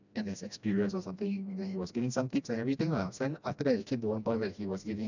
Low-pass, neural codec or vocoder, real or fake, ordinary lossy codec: 7.2 kHz; codec, 16 kHz, 1 kbps, FreqCodec, smaller model; fake; none